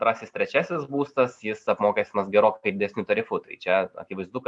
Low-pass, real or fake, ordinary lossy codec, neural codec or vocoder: 10.8 kHz; real; MP3, 96 kbps; none